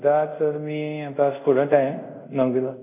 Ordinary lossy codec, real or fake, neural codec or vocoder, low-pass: none; fake; codec, 24 kHz, 0.5 kbps, DualCodec; 3.6 kHz